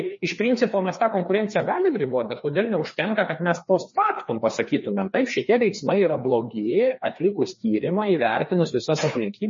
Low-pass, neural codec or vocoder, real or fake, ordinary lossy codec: 7.2 kHz; codec, 16 kHz, 2 kbps, FreqCodec, larger model; fake; MP3, 32 kbps